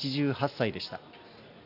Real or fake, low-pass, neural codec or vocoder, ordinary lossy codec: real; 5.4 kHz; none; none